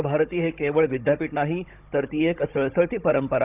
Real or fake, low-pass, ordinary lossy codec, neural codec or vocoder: fake; 3.6 kHz; none; codec, 16 kHz, 16 kbps, FunCodec, trained on Chinese and English, 50 frames a second